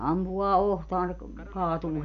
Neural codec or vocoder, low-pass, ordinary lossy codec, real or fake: none; 7.2 kHz; none; real